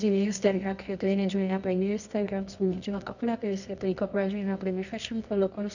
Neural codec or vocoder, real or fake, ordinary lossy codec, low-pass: codec, 24 kHz, 0.9 kbps, WavTokenizer, medium music audio release; fake; none; 7.2 kHz